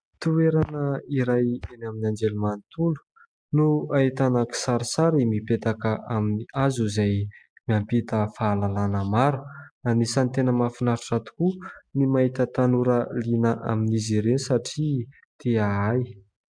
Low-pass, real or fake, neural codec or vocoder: 9.9 kHz; real; none